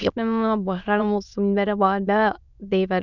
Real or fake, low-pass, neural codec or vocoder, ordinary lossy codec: fake; 7.2 kHz; autoencoder, 22.05 kHz, a latent of 192 numbers a frame, VITS, trained on many speakers; none